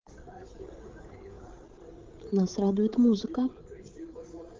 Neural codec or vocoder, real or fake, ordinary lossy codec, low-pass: codec, 16 kHz, 8 kbps, FreqCodec, larger model; fake; Opus, 16 kbps; 7.2 kHz